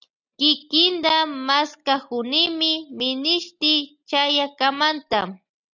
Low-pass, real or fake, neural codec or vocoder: 7.2 kHz; real; none